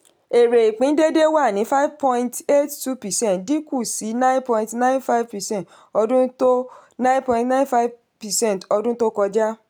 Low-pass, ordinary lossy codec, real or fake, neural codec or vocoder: none; none; real; none